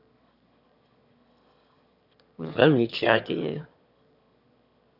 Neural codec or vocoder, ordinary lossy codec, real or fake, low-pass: autoencoder, 22.05 kHz, a latent of 192 numbers a frame, VITS, trained on one speaker; none; fake; 5.4 kHz